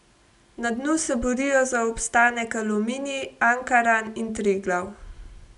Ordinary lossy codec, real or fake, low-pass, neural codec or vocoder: none; real; 10.8 kHz; none